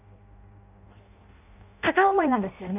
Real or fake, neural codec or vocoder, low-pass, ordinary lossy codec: fake; codec, 16 kHz in and 24 kHz out, 0.6 kbps, FireRedTTS-2 codec; 3.6 kHz; none